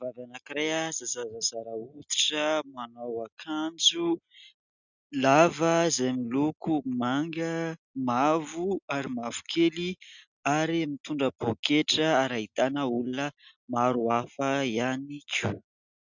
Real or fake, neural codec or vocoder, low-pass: real; none; 7.2 kHz